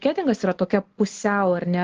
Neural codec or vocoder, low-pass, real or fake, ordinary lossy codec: none; 7.2 kHz; real; Opus, 16 kbps